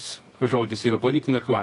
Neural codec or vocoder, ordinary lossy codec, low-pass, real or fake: codec, 24 kHz, 0.9 kbps, WavTokenizer, medium music audio release; AAC, 48 kbps; 10.8 kHz; fake